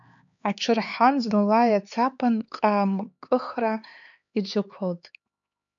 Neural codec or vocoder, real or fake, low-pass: codec, 16 kHz, 4 kbps, X-Codec, HuBERT features, trained on LibriSpeech; fake; 7.2 kHz